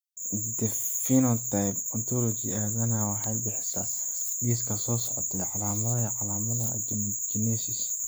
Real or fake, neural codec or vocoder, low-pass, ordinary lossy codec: real; none; none; none